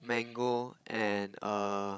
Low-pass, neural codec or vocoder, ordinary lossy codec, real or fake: none; codec, 16 kHz, 16 kbps, FreqCodec, larger model; none; fake